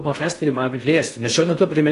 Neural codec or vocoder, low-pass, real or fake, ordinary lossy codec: codec, 16 kHz in and 24 kHz out, 0.6 kbps, FocalCodec, streaming, 4096 codes; 10.8 kHz; fake; AAC, 48 kbps